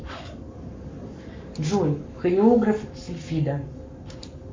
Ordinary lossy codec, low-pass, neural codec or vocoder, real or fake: MP3, 48 kbps; 7.2 kHz; codec, 44.1 kHz, 7.8 kbps, Pupu-Codec; fake